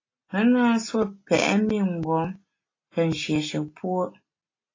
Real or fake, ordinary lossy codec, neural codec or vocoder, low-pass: real; AAC, 32 kbps; none; 7.2 kHz